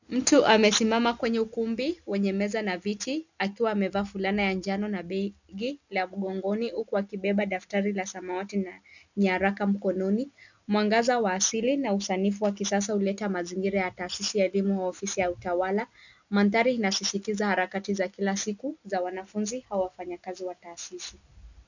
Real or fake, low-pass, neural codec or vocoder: real; 7.2 kHz; none